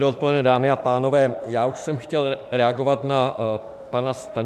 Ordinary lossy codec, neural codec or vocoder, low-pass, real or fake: AAC, 96 kbps; autoencoder, 48 kHz, 32 numbers a frame, DAC-VAE, trained on Japanese speech; 14.4 kHz; fake